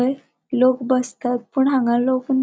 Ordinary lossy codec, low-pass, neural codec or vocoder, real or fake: none; none; none; real